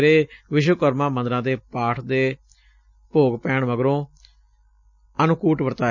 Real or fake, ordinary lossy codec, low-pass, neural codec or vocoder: real; none; 7.2 kHz; none